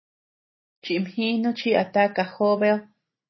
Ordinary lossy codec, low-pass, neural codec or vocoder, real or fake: MP3, 24 kbps; 7.2 kHz; none; real